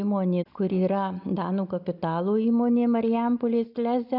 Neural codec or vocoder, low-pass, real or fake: none; 5.4 kHz; real